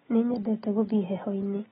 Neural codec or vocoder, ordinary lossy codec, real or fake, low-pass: none; AAC, 16 kbps; real; 7.2 kHz